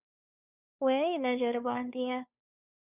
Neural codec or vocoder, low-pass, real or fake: codec, 16 kHz, 2 kbps, FunCodec, trained on Chinese and English, 25 frames a second; 3.6 kHz; fake